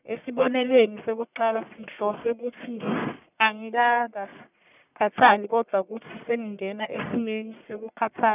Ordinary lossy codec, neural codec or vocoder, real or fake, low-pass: none; codec, 44.1 kHz, 1.7 kbps, Pupu-Codec; fake; 3.6 kHz